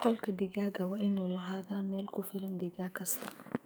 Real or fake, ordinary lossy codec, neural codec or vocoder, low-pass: fake; none; codec, 44.1 kHz, 2.6 kbps, SNAC; none